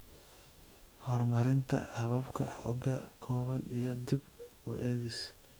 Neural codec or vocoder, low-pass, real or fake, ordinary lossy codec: codec, 44.1 kHz, 2.6 kbps, DAC; none; fake; none